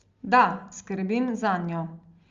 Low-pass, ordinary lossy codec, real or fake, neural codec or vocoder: 7.2 kHz; Opus, 32 kbps; real; none